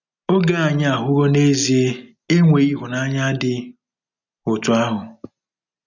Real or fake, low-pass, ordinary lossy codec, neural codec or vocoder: real; 7.2 kHz; none; none